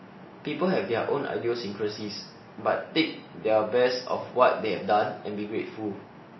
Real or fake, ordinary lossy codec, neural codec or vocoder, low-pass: real; MP3, 24 kbps; none; 7.2 kHz